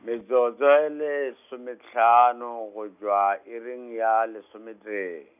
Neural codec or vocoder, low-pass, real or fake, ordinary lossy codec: none; 3.6 kHz; real; AAC, 32 kbps